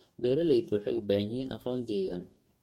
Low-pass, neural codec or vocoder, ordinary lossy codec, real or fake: 19.8 kHz; codec, 44.1 kHz, 2.6 kbps, DAC; MP3, 64 kbps; fake